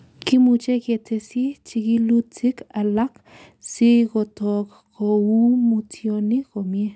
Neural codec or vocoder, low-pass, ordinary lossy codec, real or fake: none; none; none; real